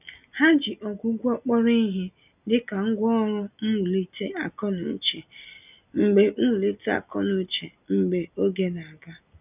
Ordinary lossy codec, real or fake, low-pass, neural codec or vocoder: none; real; 3.6 kHz; none